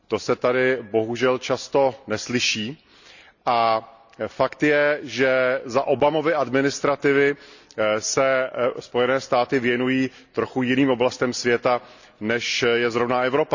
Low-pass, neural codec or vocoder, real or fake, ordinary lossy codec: 7.2 kHz; none; real; none